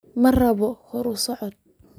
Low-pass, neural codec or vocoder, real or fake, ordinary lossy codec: none; none; real; none